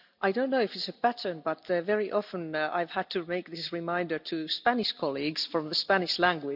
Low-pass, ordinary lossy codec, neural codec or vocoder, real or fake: 5.4 kHz; none; none; real